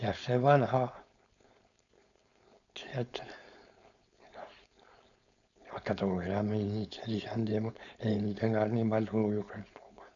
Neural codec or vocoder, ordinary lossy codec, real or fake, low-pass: codec, 16 kHz, 4.8 kbps, FACodec; none; fake; 7.2 kHz